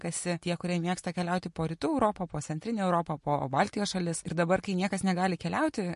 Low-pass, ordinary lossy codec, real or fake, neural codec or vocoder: 10.8 kHz; MP3, 48 kbps; real; none